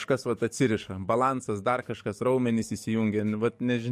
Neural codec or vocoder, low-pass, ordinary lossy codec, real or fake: codec, 44.1 kHz, 7.8 kbps, DAC; 14.4 kHz; MP3, 64 kbps; fake